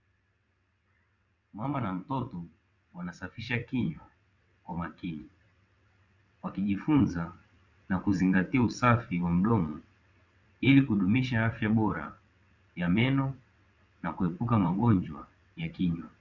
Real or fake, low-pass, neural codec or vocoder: fake; 7.2 kHz; vocoder, 22.05 kHz, 80 mel bands, WaveNeXt